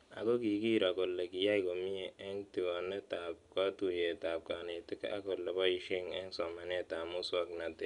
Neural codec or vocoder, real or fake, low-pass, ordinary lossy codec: none; real; 10.8 kHz; none